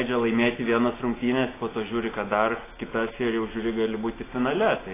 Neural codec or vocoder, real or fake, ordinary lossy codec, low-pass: none; real; AAC, 16 kbps; 3.6 kHz